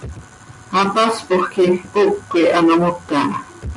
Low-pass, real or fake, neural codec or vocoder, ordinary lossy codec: 10.8 kHz; real; none; MP3, 64 kbps